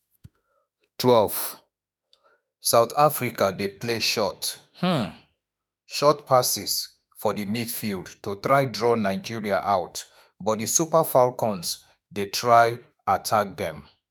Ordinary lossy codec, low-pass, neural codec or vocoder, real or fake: none; none; autoencoder, 48 kHz, 32 numbers a frame, DAC-VAE, trained on Japanese speech; fake